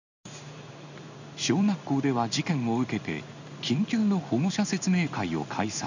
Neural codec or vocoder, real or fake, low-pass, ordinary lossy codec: codec, 16 kHz in and 24 kHz out, 1 kbps, XY-Tokenizer; fake; 7.2 kHz; none